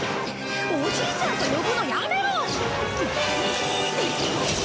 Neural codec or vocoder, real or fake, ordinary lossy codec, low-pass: none; real; none; none